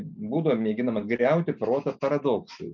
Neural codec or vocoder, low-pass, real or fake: none; 7.2 kHz; real